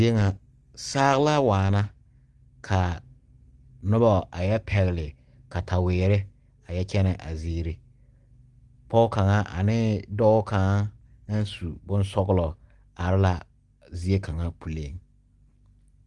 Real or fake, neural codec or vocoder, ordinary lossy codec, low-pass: real; none; Opus, 24 kbps; 10.8 kHz